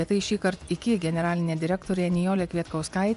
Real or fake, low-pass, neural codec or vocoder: fake; 10.8 kHz; vocoder, 24 kHz, 100 mel bands, Vocos